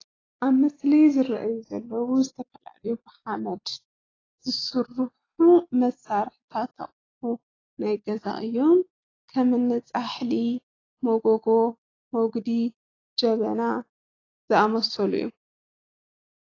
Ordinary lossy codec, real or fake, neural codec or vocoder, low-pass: AAC, 32 kbps; real; none; 7.2 kHz